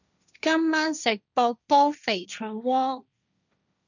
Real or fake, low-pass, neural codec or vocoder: fake; 7.2 kHz; codec, 16 kHz, 1.1 kbps, Voila-Tokenizer